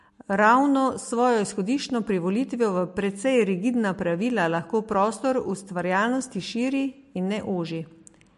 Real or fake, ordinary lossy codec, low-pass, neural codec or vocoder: real; MP3, 48 kbps; 14.4 kHz; none